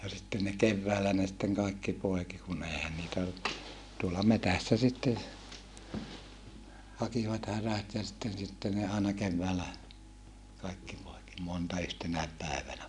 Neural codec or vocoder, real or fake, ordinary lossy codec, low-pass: none; real; none; 10.8 kHz